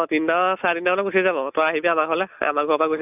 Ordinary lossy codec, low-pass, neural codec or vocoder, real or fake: none; 3.6 kHz; none; real